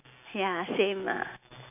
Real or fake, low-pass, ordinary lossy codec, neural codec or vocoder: real; 3.6 kHz; none; none